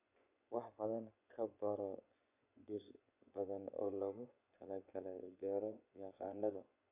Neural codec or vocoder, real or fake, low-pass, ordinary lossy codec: vocoder, 24 kHz, 100 mel bands, Vocos; fake; 3.6 kHz; AAC, 32 kbps